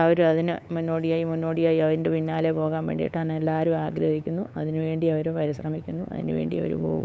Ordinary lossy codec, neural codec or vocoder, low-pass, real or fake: none; codec, 16 kHz, 8 kbps, FunCodec, trained on LibriTTS, 25 frames a second; none; fake